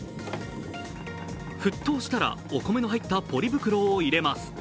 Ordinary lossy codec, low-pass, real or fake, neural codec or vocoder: none; none; real; none